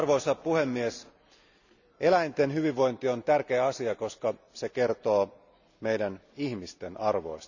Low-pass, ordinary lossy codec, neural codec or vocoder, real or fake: 7.2 kHz; none; none; real